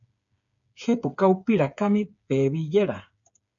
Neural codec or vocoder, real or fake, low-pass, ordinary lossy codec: codec, 16 kHz, 8 kbps, FreqCodec, smaller model; fake; 7.2 kHz; MP3, 96 kbps